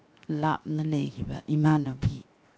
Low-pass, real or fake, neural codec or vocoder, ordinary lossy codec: none; fake; codec, 16 kHz, 0.7 kbps, FocalCodec; none